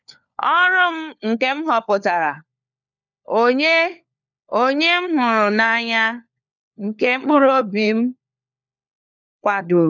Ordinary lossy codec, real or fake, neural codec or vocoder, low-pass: none; fake; codec, 16 kHz, 4 kbps, FunCodec, trained on LibriTTS, 50 frames a second; 7.2 kHz